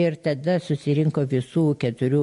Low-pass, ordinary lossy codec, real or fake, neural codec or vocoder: 10.8 kHz; MP3, 48 kbps; real; none